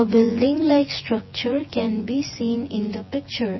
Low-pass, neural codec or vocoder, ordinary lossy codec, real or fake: 7.2 kHz; vocoder, 24 kHz, 100 mel bands, Vocos; MP3, 24 kbps; fake